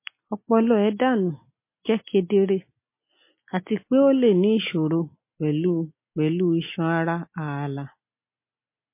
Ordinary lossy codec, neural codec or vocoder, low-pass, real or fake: MP3, 24 kbps; none; 3.6 kHz; real